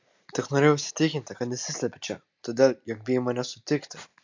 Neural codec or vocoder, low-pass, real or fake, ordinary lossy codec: none; 7.2 kHz; real; MP3, 64 kbps